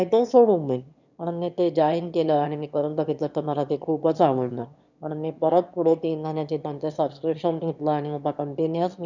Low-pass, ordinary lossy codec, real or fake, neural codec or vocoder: 7.2 kHz; none; fake; autoencoder, 22.05 kHz, a latent of 192 numbers a frame, VITS, trained on one speaker